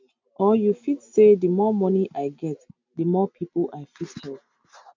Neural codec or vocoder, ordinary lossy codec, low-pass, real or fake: none; MP3, 64 kbps; 7.2 kHz; real